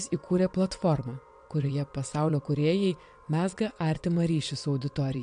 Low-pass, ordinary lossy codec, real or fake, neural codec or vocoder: 9.9 kHz; AAC, 96 kbps; fake; vocoder, 22.05 kHz, 80 mel bands, WaveNeXt